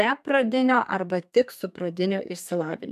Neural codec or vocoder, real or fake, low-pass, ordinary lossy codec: codec, 32 kHz, 1.9 kbps, SNAC; fake; 14.4 kHz; AAC, 96 kbps